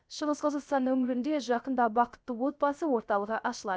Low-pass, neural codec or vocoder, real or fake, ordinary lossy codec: none; codec, 16 kHz, 0.3 kbps, FocalCodec; fake; none